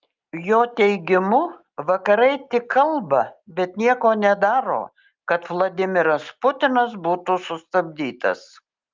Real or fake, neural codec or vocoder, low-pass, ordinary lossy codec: real; none; 7.2 kHz; Opus, 24 kbps